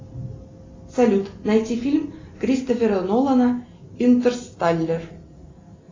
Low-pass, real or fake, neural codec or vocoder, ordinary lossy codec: 7.2 kHz; real; none; AAC, 32 kbps